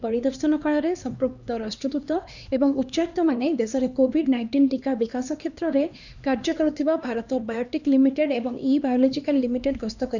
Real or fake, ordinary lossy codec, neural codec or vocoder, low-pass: fake; none; codec, 16 kHz, 2 kbps, X-Codec, HuBERT features, trained on LibriSpeech; 7.2 kHz